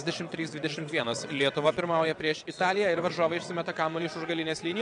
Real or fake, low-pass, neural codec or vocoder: fake; 9.9 kHz; vocoder, 22.05 kHz, 80 mel bands, WaveNeXt